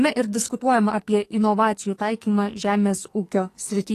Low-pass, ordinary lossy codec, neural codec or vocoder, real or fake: 14.4 kHz; AAC, 48 kbps; codec, 44.1 kHz, 2.6 kbps, SNAC; fake